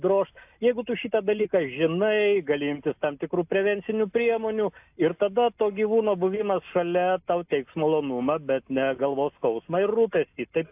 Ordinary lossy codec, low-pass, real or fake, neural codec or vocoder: AAC, 32 kbps; 3.6 kHz; real; none